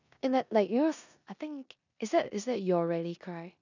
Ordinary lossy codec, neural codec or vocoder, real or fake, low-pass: none; codec, 16 kHz in and 24 kHz out, 0.9 kbps, LongCat-Audio-Codec, four codebook decoder; fake; 7.2 kHz